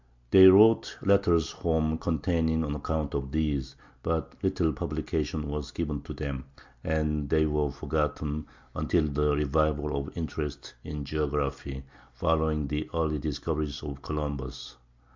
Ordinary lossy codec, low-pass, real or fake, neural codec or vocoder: MP3, 64 kbps; 7.2 kHz; real; none